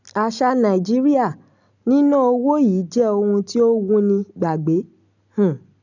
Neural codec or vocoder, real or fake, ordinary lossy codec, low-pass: none; real; none; 7.2 kHz